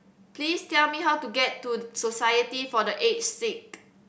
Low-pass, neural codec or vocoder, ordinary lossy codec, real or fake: none; none; none; real